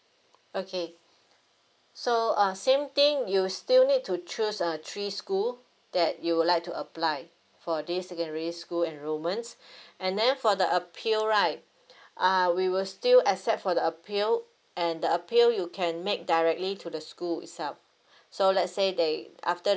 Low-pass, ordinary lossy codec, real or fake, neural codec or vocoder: none; none; real; none